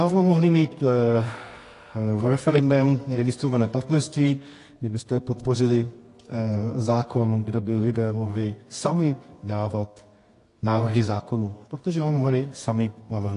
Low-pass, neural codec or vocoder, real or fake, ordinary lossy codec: 10.8 kHz; codec, 24 kHz, 0.9 kbps, WavTokenizer, medium music audio release; fake; AAC, 48 kbps